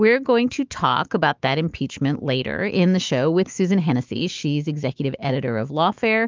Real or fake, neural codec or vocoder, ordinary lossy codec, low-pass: fake; autoencoder, 48 kHz, 128 numbers a frame, DAC-VAE, trained on Japanese speech; Opus, 32 kbps; 7.2 kHz